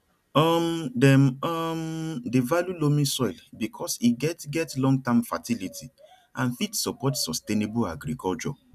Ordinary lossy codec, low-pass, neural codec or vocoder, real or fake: none; 14.4 kHz; none; real